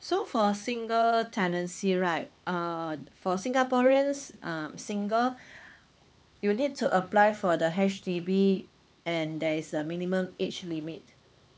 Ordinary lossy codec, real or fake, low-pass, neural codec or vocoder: none; fake; none; codec, 16 kHz, 4 kbps, X-Codec, HuBERT features, trained on LibriSpeech